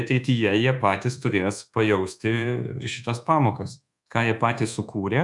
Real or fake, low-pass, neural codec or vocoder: fake; 10.8 kHz; codec, 24 kHz, 1.2 kbps, DualCodec